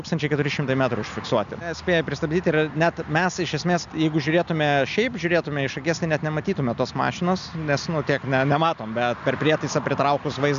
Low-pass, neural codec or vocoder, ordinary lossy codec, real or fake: 7.2 kHz; none; MP3, 96 kbps; real